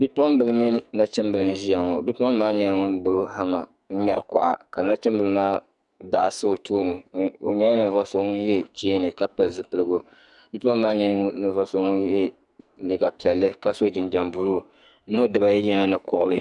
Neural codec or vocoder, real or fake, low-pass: codec, 44.1 kHz, 2.6 kbps, SNAC; fake; 10.8 kHz